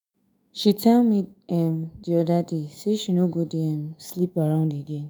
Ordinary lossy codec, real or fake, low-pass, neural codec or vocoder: none; fake; none; autoencoder, 48 kHz, 128 numbers a frame, DAC-VAE, trained on Japanese speech